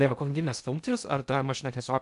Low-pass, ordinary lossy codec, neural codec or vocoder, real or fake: 10.8 kHz; AAC, 96 kbps; codec, 16 kHz in and 24 kHz out, 0.6 kbps, FocalCodec, streaming, 2048 codes; fake